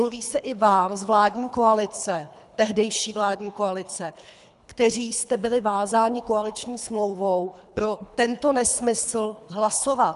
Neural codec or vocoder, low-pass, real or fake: codec, 24 kHz, 3 kbps, HILCodec; 10.8 kHz; fake